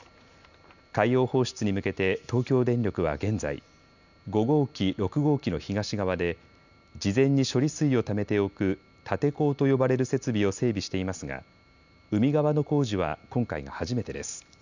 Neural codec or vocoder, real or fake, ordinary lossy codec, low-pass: none; real; none; 7.2 kHz